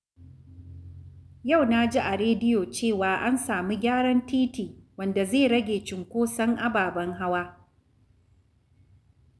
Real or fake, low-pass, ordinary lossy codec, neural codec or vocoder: real; none; none; none